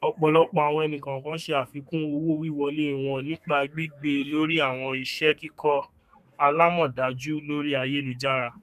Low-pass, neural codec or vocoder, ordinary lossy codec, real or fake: 14.4 kHz; codec, 32 kHz, 1.9 kbps, SNAC; none; fake